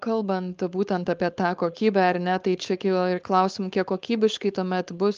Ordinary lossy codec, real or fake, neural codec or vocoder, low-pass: Opus, 32 kbps; fake; codec, 16 kHz, 4.8 kbps, FACodec; 7.2 kHz